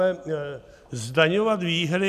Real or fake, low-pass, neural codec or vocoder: real; 14.4 kHz; none